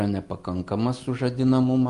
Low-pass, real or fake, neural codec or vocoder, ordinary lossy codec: 10.8 kHz; real; none; Opus, 32 kbps